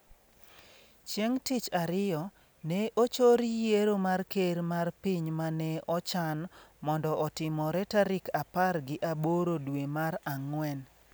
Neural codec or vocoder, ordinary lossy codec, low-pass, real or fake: none; none; none; real